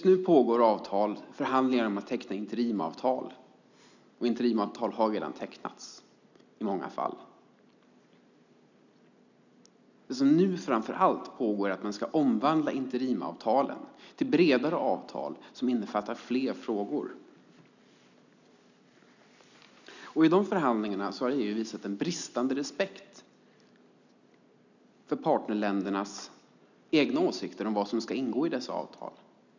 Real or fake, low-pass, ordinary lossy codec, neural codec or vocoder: real; 7.2 kHz; none; none